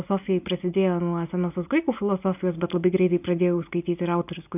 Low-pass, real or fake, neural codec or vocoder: 3.6 kHz; real; none